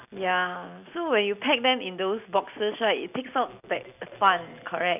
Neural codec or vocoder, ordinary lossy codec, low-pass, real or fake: none; none; 3.6 kHz; real